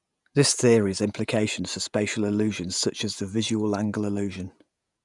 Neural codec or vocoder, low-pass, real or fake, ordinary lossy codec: vocoder, 48 kHz, 128 mel bands, Vocos; 10.8 kHz; fake; none